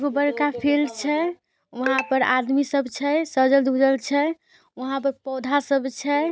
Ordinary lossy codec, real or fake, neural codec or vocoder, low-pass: none; real; none; none